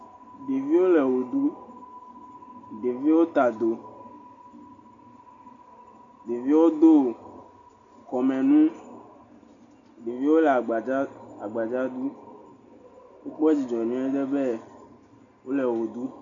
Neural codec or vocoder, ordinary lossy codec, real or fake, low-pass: none; MP3, 96 kbps; real; 7.2 kHz